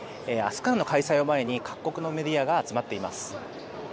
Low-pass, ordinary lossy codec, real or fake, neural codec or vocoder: none; none; real; none